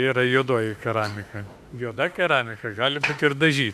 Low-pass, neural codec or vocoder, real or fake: 14.4 kHz; autoencoder, 48 kHz, 32 numbers a frame, DAC-VAE, trained on Japanese speech; fake